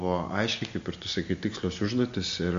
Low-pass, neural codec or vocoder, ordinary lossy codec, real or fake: 7.2 kHz; none; MP3, 48 kbps; real